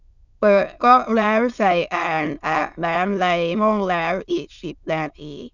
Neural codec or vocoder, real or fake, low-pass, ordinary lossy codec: autoencoder, 22.05 kHz, a latent of 192 numbers a frame, VITS, trained on many speakers; fake; 7.2 kHz; none